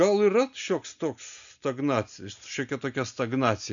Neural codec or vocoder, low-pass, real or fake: none; 7.2 kHz; real